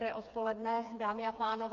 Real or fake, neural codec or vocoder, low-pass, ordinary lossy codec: fake; codec, 16 kHz, 4 kbps, FreqCodec, smaller model; 7.2 kHz; MP3, 48 kbps